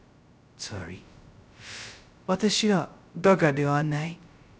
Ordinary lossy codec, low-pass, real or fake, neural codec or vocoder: none; none; fake; codec, 16 kHz, 0.2 kbps, FocalCodec